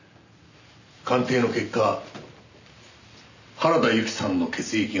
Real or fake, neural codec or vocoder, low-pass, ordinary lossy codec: real; none; 7.2 kHz; none